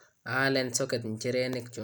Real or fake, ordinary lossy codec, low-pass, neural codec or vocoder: real; none; none; none